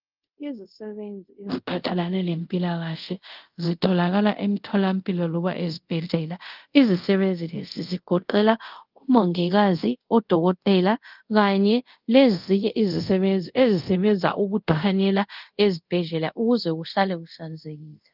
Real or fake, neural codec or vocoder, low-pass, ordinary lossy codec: fake; codec, 24 kHz, 0.5 kbps, DualCodec; 5.4 kHz; Opus, 32 kbps